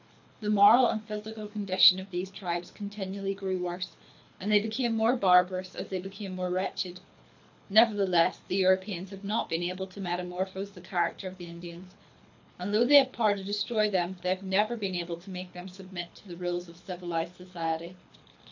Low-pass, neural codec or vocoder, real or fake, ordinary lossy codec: 7.2 kHz; codec, 24 kHz, 6 kbps, HILCodec; fake; AAC, 48 kbps